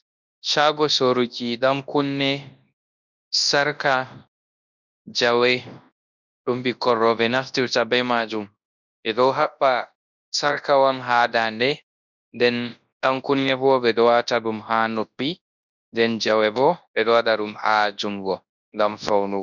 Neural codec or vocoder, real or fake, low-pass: codec, 24 kHz, 0.9 kbps, WavTokenizer, large speech release; fake; 7.2 kHz